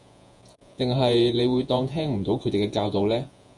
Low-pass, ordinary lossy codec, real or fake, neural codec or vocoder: 10.8 kHz; Opus, 64 kbps; fake; vocoder, 48 kHz, 128 mel bands, Vocos